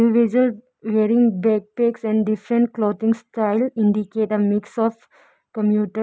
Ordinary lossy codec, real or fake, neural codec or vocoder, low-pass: none; real; none; none